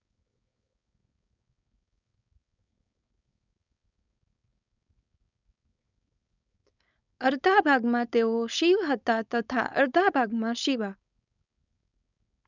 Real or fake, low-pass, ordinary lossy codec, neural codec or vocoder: fake; 7.2 kHz; none; codec, 16 kHz, 4.8 kbps, FACodec